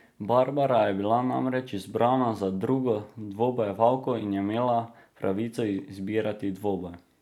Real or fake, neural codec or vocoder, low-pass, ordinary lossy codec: real; none; 19.8 kHz; none